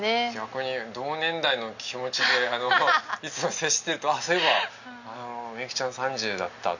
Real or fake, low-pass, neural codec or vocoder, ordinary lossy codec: real; 7.2 kHz; none; none